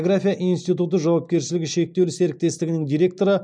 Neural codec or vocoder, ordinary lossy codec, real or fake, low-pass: none; none; real; 9.9 kHz